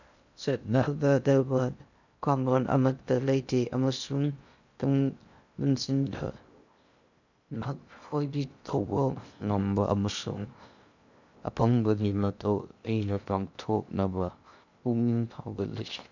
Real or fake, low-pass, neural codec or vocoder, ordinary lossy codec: fake; 7.2 kHz; codec, 16 kHz in and 24 kHz out, 0.6 kbps, FocalCodec, streaming, 2048 codes; none